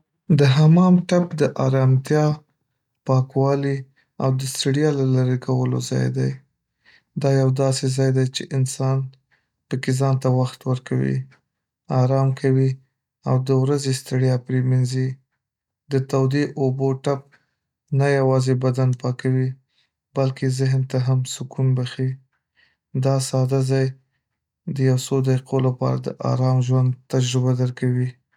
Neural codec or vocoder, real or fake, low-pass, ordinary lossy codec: none; real; 19.8 kHz; none